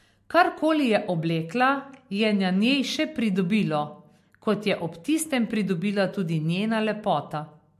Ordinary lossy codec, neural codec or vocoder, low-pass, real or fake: MP3, 64 kbps; none; 14.4 kHz; real